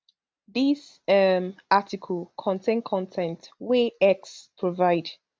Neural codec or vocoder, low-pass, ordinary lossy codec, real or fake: none; none; none; real